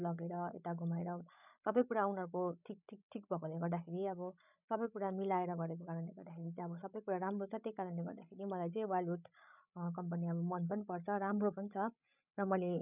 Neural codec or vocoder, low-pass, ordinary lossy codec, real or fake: codec, 16 kHz, 16 kbps, FreqCodec, larger model; 3.6 kHz; none; fake